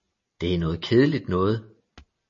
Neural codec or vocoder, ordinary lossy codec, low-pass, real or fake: none; MP3, 32 kbps; 7.2 kHz; real